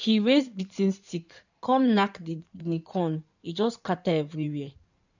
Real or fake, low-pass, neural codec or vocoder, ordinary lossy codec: fake; 7.2 kHz; codec, 16 kHz in and 24 kHz out, 2.2 kbps, FireRedTTS-2 codec; none